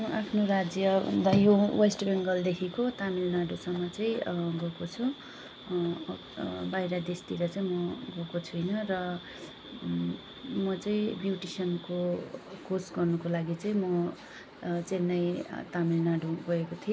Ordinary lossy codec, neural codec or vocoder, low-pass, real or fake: none; none; none; real